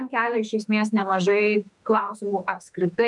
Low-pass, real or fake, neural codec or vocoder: 10.8 kHz; fake; autoencoder, 48 kHz, 32 numbers a frame, DAC-VAE, trained on Japanese speech